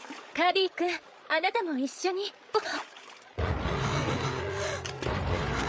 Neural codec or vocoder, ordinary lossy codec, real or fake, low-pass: codec, 16 kHz, 8 kbps, FreqCodec, larger model; none; fake; none